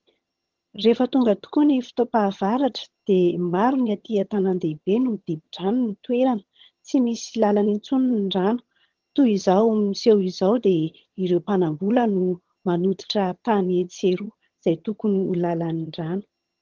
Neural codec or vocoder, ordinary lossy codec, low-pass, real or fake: vocoder, 22.05 kHz, 80 mel bands, HiFi-GAN; Opus, 16 kbps; 7.2 kHz; fake